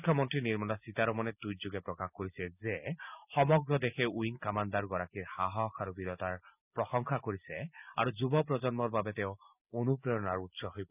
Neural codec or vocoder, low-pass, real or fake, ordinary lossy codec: none; 3.6 kHz; real; none